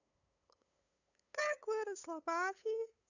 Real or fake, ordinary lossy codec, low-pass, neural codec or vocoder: fake; none; 7.2 kHz; codec, 16 kHz, 8 kbps, FunCodec, trained on LibriTTS, 25 frames a second